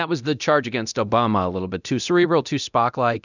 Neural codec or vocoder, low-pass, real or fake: codec, 24 kHz, 0.9 kbps, DualCodec; 7.2 kHz; fake